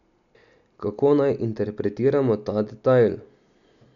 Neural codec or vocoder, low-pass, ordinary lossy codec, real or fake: none; 7.2 kHz; none; real